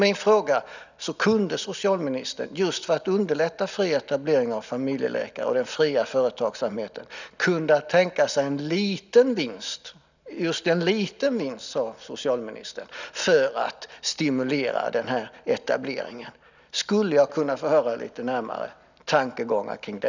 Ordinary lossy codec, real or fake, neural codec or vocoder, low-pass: none; real; none; 7.2 kHz